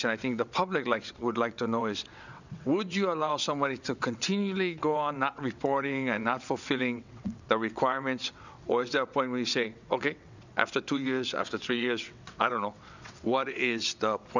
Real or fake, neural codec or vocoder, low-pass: fake; vocoder, 22.05 kHz, 80 mel bands, WaveNeXt; 7.2 kHz